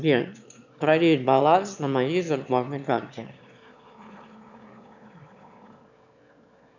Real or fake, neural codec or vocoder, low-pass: fake; autoencoder, 22.05 kHz, a latent of 192 numbers a frame, VITS, trained on one speaker; 7.2 kHz